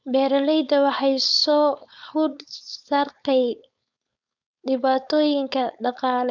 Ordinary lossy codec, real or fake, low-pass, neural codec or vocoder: none; fake; 7.2 kHz; codec, 16 kHz, 4.8 kbps, FACodec